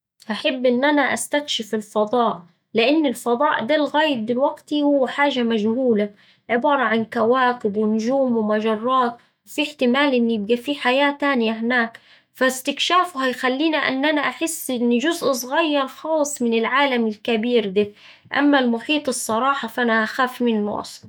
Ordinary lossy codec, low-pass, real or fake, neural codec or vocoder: none; none; fake; autoencoder, 48 kHz, 128 numbers a frame, DAC-VAE, trained on Japanese speech